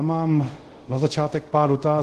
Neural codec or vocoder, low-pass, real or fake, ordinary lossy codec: codec, 24 kHz, 0.5 kbps, DualCodec; 10.8 kHz; fake; Opus, 16 kbps